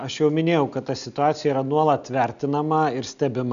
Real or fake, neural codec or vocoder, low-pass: real; none; 7.2 kHz